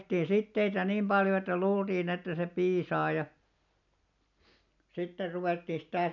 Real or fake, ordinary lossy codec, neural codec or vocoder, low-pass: real; none; none; 7.2 kHz